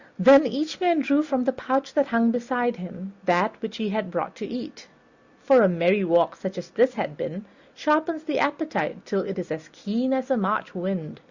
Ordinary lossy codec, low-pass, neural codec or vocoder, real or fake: Opus, 64 kbps; 7.2 kHz; none; real